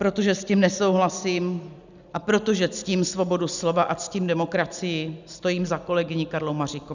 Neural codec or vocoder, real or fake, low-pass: none; real; 7.2 kHz